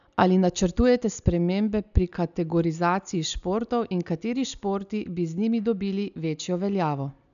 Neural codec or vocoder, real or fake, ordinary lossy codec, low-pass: none; real; none; 7.2 kHz